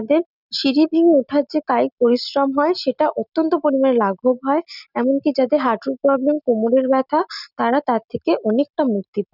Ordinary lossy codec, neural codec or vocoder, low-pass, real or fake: none; none; 5.4 kHz; real